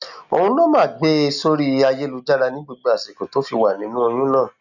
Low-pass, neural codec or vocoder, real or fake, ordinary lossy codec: 7.2 kHz; none; real; none